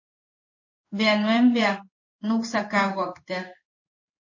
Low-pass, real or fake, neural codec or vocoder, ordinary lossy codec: 7.2 kHz; fake; codec, 16 kHz in and 24 kHz out, 1 kbps, XY-Tokenizer; MP3, 32 kbps